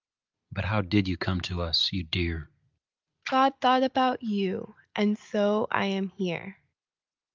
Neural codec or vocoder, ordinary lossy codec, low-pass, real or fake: none; Opus, 32 kbps; 7.2 kHz; real